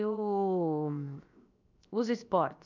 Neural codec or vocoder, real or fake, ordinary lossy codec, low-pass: codec, 16 kHz, 0.7 kbps, FocalCodec; fake; none; 7.2 kHz